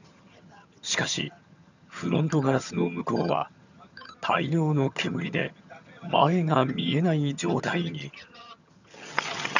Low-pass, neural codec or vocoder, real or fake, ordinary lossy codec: 7.2 kHz; vocoder, 22.05 kHz, 80 mel bands, HiFi-GAN; fake; none